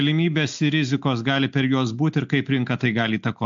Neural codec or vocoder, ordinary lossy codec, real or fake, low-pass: none; AAC, 64 kbps; real; 7.2 kHz